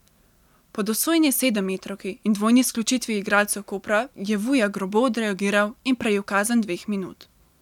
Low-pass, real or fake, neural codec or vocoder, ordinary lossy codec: 19.8 kHz; real; none; none